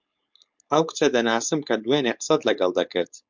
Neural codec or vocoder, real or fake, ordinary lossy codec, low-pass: codec, 16 kHz, 4.8 kbps, FACodec; fake; MP3, 64 kbps; 7.2 kHz